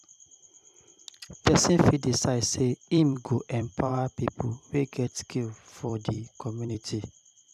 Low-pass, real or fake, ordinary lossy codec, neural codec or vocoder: 14.4 kHz; fake; none; vocoder, 44.1 kHz, 128 mel bands every 256 samples, BigVGAN v2